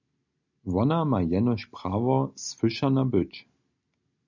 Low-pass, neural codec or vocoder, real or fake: 7.2 kHz; none; real